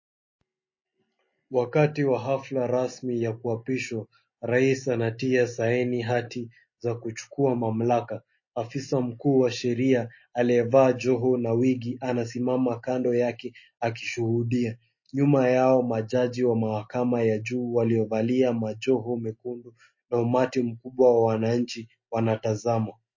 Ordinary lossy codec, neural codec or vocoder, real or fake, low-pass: MP3, 32 kbps; none; real; 7.2 kHz